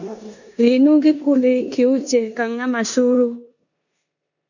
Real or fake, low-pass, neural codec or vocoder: fake; 7.2 kHz; codec, 16 kHz in and 24 kHz out, 0.9 kbps, LongCat-Audio-Codec, four codebook decoder